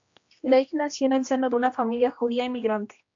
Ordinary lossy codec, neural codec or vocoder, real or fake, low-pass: AAC, 48 kbps; codec, 16 kHz, 1 kbps, X-Codec, HuBERT features, trained on general audio; fake; 7.2 kHz